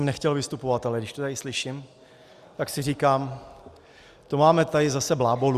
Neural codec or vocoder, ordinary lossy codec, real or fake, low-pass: none; Opus, 64 kbps; real; 14.4 kHz